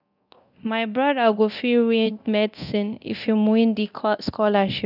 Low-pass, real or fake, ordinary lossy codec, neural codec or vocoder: 5.4 kHz; fake; none; codec, 24 kHz, 0.9 kbps, DualCodec